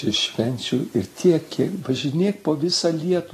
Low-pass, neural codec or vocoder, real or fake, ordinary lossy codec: 14.4 kHz; none; real; AAC, 48 kbps